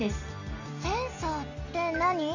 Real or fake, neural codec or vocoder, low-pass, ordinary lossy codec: real; none; 7.2 kHz; none